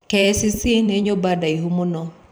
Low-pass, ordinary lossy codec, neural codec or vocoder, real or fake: none; none; vocoder, 44.1 kHz, 128 mel bands every 512 samples, BigVGAN v2; fake